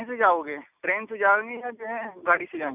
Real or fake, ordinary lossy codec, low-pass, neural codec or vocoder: real; none; 3.6 kHz; none